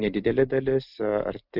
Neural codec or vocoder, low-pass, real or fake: none; 5.4 kHz; real